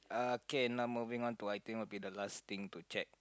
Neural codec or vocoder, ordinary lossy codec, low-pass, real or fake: none; none; none; real